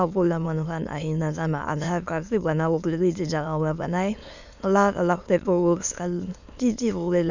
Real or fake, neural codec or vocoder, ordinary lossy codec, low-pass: fake; autoencoder, 22.05 kHz, a latent of 192 numbers a frame, VITS, trained on many speakers; none; 7.2 kHz